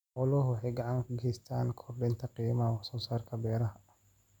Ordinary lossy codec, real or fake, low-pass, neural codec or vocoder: none; real; 19.8 kHz; none